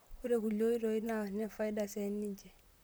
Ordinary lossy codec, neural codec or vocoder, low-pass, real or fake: none; vocoder, 44.1 kHz, 128 mel bands, Pupu-Vocoder; none; fake